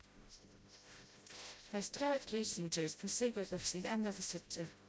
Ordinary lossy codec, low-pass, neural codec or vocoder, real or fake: none; none; codec, 16 kHz, 0.5 kbps, FreqCodec, smaller model; fake